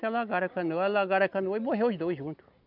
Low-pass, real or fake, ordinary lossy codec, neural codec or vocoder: 5.4 kHz; real; none; none